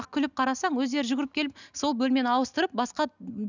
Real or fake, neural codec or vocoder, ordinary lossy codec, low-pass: real; none; none; 7.2 kHz